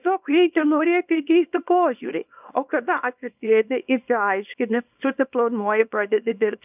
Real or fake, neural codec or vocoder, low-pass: fake; codec, 24 kHz, 0.9 kbps, WavTokenizer, small release; 3.6 kHz